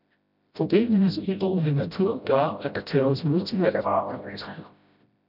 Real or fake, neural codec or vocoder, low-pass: fake; codec, 16 kHz, 0.5 kbps, FreqCodec, smaller model; 5.4 kHz